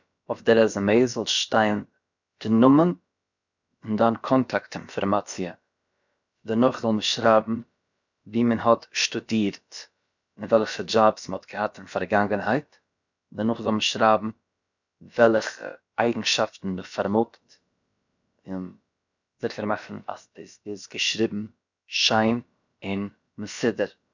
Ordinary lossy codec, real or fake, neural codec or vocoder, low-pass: none; fake; codec, 16 kHz, about 1 kbps, DyCAST, with the encoder's durations; 7.2 kHz